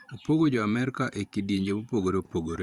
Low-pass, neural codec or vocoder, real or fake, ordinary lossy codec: 19.8 kHz; vocoder, 44.1 kHz, 128 mel bands every 512 samples, BigVGAN v2; fake; Opus, 64 kbps